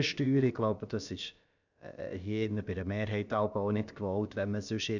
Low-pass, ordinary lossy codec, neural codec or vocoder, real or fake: 7.2 kHz; none; codec, 16 kHz, about 1 kbps, DyCAST, with the encoder's durations; fake